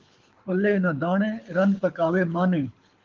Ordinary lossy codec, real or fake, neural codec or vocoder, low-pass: Opus, 32 kbps; fake; codec, 24 kHz, 6 kbps, HILCodec; 7.2 kHz